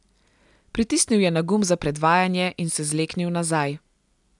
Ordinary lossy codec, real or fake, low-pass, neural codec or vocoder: none; real; 10.8 kHz; none